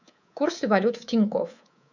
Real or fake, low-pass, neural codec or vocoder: fake; 7.2 kHz; codec, 24 kHz, 3.1 kbps, DualCodec